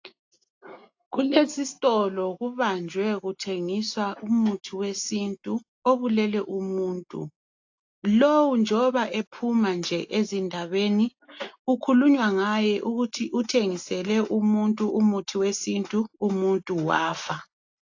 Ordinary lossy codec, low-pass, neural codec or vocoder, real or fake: AAC, 48 kbps; 7.2 kHz; none; real